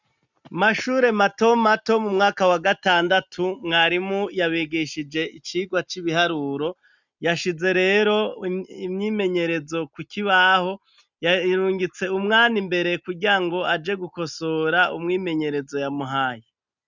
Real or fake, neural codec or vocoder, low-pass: real; none; 7.2 kHz